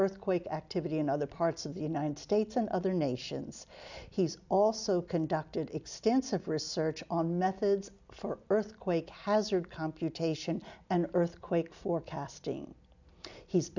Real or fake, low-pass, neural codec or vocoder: real; 7.2 kHz; none